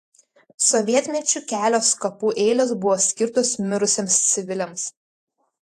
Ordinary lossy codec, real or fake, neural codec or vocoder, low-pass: AAC, 64 kbps; fake; vocoder, 48 kHz, 128 mel bands, Vocos; 14.4 kHz